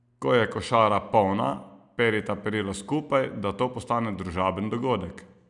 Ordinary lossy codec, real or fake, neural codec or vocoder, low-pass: none; real; none; 10.8 kHz